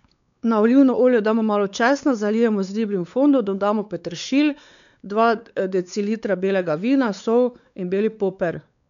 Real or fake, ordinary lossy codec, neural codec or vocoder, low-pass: fake; none; codec, 16 kHz, 4 kbps, X-Codec, WavLM features, trained on Multilingual LibriSpeech; 7.2 kHz